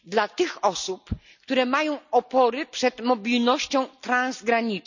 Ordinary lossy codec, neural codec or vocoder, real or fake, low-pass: none; none; real; 7.2 kHz